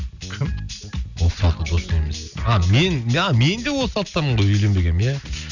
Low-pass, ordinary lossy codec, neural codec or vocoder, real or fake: 7.2 kHz; none; none; real